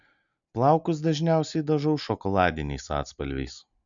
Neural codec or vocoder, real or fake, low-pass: none; real; 7.2 kHz